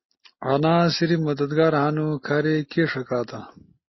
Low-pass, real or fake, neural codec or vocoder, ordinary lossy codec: 7.2 kHz; real; none; MP3, 24 kbps